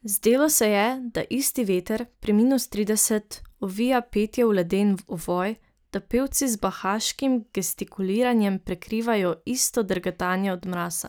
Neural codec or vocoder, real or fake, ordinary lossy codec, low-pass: none; real; none; none